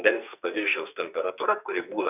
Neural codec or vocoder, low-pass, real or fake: codec, 44.1 kHz, 2.6 kbps, SNAC; 3.6 kHz; fake